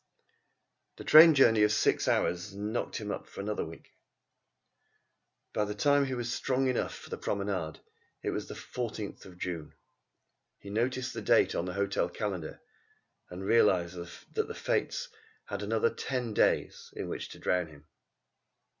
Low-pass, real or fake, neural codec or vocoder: 7.2 kHz; real; none